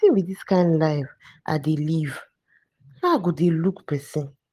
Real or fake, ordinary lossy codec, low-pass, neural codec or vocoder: real; Opus, 24 kbps; 14.4 kHz; none